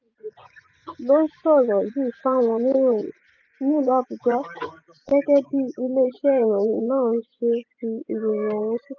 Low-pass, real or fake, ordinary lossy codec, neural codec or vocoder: 7.2 kHz; fake; none; vocoder, 24 kHz, 100 mel bands, Vocos